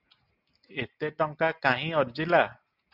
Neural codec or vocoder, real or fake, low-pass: none; real; 5.4 kHz